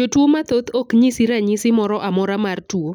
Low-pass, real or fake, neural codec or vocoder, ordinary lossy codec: 19.8 kHz; real; none; none